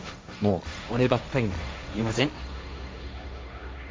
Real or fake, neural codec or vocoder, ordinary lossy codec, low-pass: fake; codec, 16 kHz, 1.1 kbps, Voila-Tokenizer; none; none